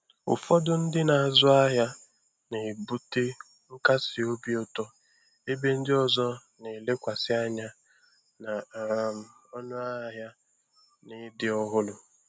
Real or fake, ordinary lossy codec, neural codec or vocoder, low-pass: real; none; none; none